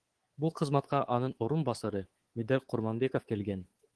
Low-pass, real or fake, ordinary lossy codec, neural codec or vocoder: 10.8 kHz; fake; Opus, 16 kbps; codec, 24 kHz, 3.1 kbps, DualCodec